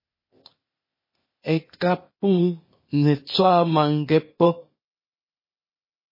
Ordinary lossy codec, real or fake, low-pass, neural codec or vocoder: MP3, 24 kbps; fake; 5.4 kHz; codec, 16 kHz, 0.8 kbps, ZipCodec